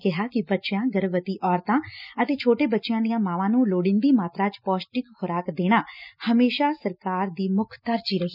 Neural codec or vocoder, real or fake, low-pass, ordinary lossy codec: none; real; 5.4 kHz; none